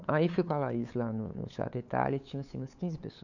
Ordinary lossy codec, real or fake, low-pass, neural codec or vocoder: none; fake; 7.2 kHz; codec, 16 kHz, 8 kbps, FunCodec, trained on LibriTTS, 25 frames a second